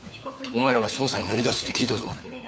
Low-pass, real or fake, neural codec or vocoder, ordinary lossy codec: none; fake; codec, 16 kHz, 4 kbps, FunCodec, trained on LibriTTS, 50 frames a second; none